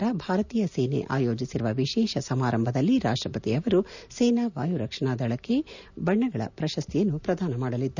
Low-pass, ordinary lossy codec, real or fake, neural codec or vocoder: 7.2 kHz; none; real; none